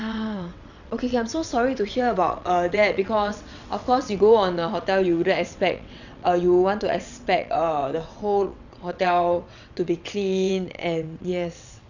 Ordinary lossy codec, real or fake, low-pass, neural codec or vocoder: none; fake; 7.2 kHz; vocoder, 22.05 kHz, 80 mel bands, WaveNeXt